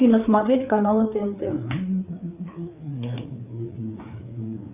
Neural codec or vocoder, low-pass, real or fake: codec, 16 kHz, 4 kbps, FreqCodec, larger model; 3.6 kHz; fake